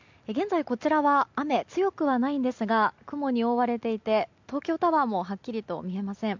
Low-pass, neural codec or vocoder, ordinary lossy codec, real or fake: 7.2 kHz; none; MP3, 64 kbps; real